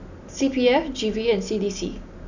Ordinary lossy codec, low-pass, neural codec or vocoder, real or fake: none; 7.2 kHz; none; real